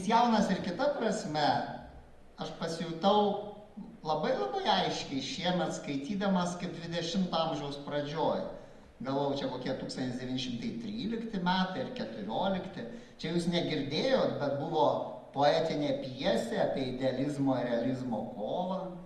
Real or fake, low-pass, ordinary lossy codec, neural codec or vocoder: real; 14.4 kHz; Opus, 32 kbps; none